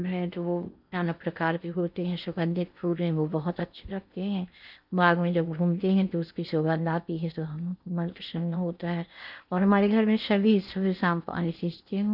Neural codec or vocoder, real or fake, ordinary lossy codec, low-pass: codec, 16 kHz in and 24 kHz out, 0.8 kbps, FocalCodec, streaming, 65536 codes; fake; none; 5.4 kHz